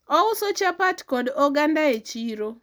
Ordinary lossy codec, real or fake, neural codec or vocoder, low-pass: none; real; none; none